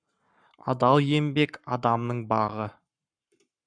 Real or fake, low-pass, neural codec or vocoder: fake; 9.9 kHz; codec, 44.1 kHz, 7.8 kbps, Pupu-Codec